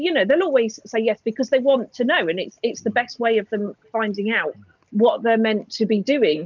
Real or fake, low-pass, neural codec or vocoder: real; 7.2 kHz; none